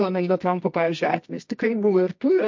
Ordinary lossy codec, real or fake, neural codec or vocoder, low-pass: MP3, 48 kbps; fake; codec, 24 kHz, 0.9 kbps, WavTokenizer, medium music audio release; 7.2 kHz